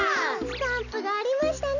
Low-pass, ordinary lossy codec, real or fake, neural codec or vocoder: 7.2 kHz; none; real; none